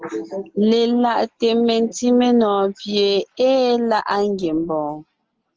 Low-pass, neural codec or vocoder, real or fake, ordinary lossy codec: 7.2 kHz; none; real; Opus, 16 kbps